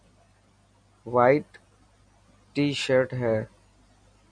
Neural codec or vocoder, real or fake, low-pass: none; real; 9.9 kHz